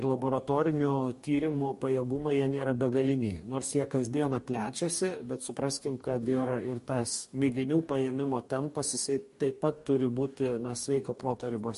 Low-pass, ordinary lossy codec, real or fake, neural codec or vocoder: 14.4 kHz; MP3, 48 kbps; fake; codec, 44.1 kHz, 2.6 kbps, DAC